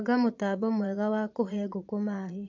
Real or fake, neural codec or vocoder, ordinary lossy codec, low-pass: fake; vocoder, 22.05 kHz, 80 mel bands, Vocos; AAC, 48 kbps; 7.2 kHz